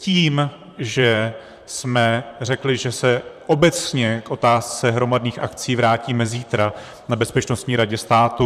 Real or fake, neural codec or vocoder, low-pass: fake; vocoder, 44.1 kHz, 128 mel bands, Pupu-Vocoder; 14.4 kHz